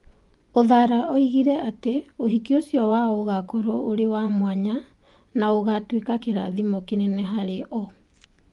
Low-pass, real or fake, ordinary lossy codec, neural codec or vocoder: 10.8 kHz; fake; Opus, 32 kbps; vocoder, 24 kHz, 100 mel bands, Vocos